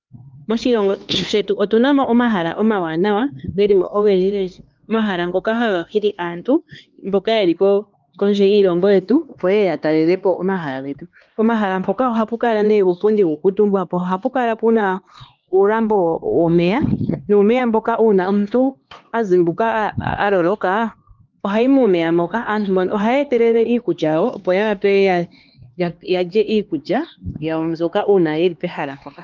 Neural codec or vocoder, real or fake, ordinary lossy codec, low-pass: codec, 16 kHz, 2 kbps, X-Codec, HuBERT features, trained on LibriSpeech; fake; Opus, 24 kbps; 7.2 kHz